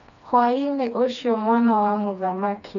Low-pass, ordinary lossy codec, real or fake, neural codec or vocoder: 7.2 kHz; none; fake; codec, 16 kHz, 2 kbps, FreqCodec, smaller model